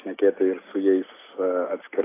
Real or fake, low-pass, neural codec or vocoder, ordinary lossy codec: real; 3.6 kHz; none; AAC, 16 kbps